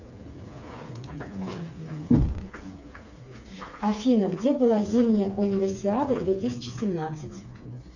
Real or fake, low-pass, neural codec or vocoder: fake; 7.2 kHz; codec, 16 kHz, 4 kbps, FreqCodec, smaller model